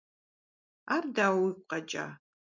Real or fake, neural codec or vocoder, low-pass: real; none; 7.2 kHz